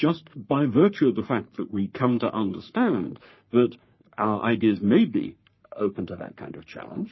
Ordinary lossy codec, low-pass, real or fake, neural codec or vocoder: MP3, 24 kbps; 7.2 kHz; fake; codec, 44.1 kHz, 3.4 kbps, Pupu-Codec